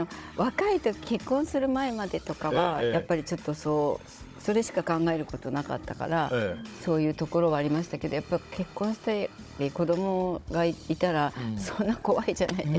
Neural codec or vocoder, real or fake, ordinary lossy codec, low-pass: codec, 16 kHz, 16 kbps, FunCodec, trained on Chinese and English, 50 frames a second; fake; none; none